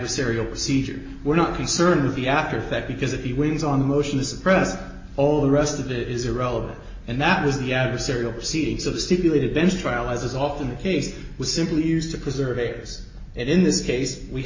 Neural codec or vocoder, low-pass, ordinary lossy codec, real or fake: none; 7.2 kHz; MP3, 32 kbps; real